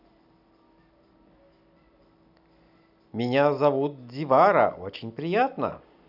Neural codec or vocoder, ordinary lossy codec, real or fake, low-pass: vocoder, 44.1 kHz, 128 mel bands every 256 samples, BigVGAN v2; none; fake; 5.4 kHz